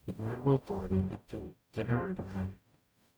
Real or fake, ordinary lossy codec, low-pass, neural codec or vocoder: fake; none; none; codec, 44.1 kHz, 0.9 kbps, DAC